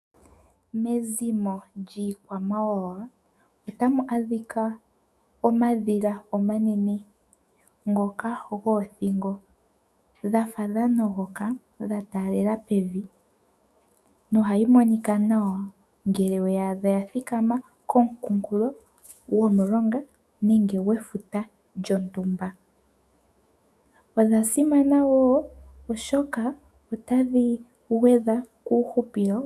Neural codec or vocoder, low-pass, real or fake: autoencoder, 48 kHz, 128 numbers a frame, DAC-VAE, trained on Japanese speech; 14.4 kHz; fake